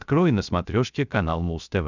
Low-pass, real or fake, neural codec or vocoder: 7.2 kHz; fake; codec, 16 kHz, 0.3 kbps, FocalCodec